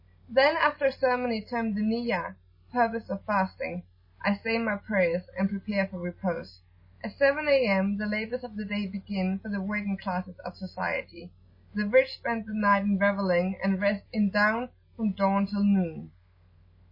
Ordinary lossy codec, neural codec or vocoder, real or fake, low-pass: MP3, 24 kbps; none; real; 5.4 kHz